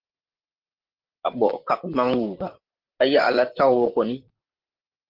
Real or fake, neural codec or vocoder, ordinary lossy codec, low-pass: fake; vocoder, 44.1 kHz, 128 mel bands, Pupu-Vocoder; Opus, 16 kbps; 5.4 kHz